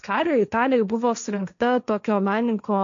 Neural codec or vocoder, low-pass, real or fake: codec, 16 kHz, 1.1 kbps, Voila-Tokenizer; 7.2 kHz; fake